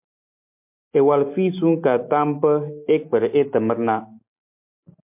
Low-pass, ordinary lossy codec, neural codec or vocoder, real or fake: 3.6 kHz; MP3, 32 kbps; none; real